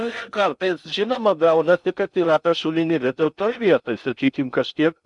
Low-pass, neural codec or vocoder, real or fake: 10.8 kHz; codec, 16 kHz in and 24 kHz out, 0.6 kbps, FocalCodec, streaming, 4096 codes; fake